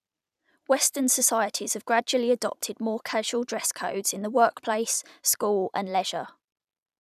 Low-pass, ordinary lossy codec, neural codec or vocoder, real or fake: 14.4 kHz; none; vocoder, 44.1 kHz, 128 mel bands every 256 samples, BigVGAN v2; fake